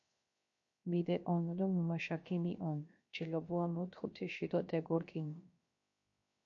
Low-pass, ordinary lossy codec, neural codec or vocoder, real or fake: 7.2 kHz; MP3, 48 kbps; codec, 16 kHz, 0.7 kbps, FocalCodec; fake